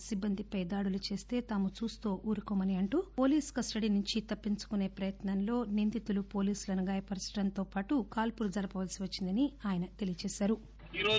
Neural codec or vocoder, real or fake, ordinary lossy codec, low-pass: none; real; none; none